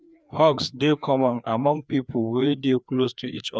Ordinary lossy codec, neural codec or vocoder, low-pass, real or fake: none; codec, 16 kHz, 2 kbps, FreqCodec, larger model; none; fake